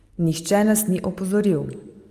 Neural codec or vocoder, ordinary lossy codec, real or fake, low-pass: none; Opus, 32 kbps; real; 14.4 kHz